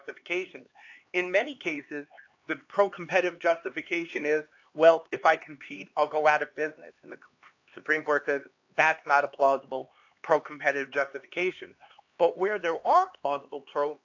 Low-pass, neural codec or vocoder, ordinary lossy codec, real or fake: 7.2 kHz; codec, 16 kHz, 2 kbps, X-Codec, HuBERT features, trained on LibriSpeech; AAC, 48 kbps; fake